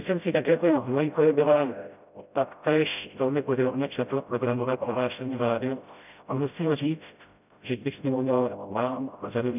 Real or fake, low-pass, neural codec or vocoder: fake; 3.6 kHz; codec, 16 kHz, 0.5 kbps, FreqCodec, smaller model